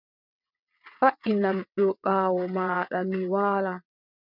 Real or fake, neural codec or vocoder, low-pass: fake; vocoder, 22.05 kHz, 80 mel bands, WaveNeXt; 5.4 kHz